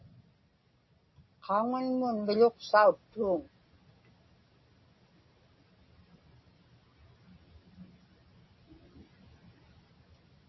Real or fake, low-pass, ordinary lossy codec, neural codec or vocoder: real; 7.2 kHz; MP3, 24 kbps; none